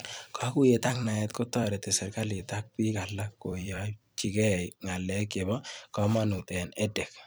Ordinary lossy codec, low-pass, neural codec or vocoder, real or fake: none; none; vocoder, 44.1 kHz, 128 mel bands every 256 samples, BigVGAN v2; fake